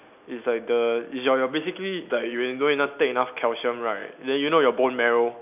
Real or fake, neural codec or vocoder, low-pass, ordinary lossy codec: real; none; 3.6 kHz; none